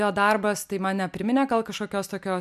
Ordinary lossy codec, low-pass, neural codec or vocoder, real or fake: MP3, 96 kbps; 14.4 kHz; none; real